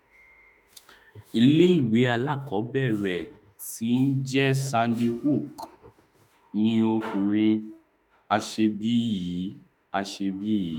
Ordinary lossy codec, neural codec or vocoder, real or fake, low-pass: none; autoencoder, 48 kHz, 32 numbers a frame, DAC-VAE, trained on Japanese speech; fake; 19.8 kHz